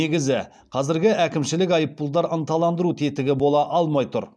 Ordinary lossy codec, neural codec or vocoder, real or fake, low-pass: none; none; real; none